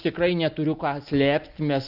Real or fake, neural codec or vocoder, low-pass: real; none; 5.4 kHz